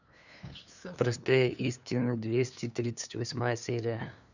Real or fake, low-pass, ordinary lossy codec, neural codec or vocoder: fake; 7.2 kHz; none; codec, 16 kHz, 2 kbps, FunCodec, trained on LibriTTS, 25 frames a second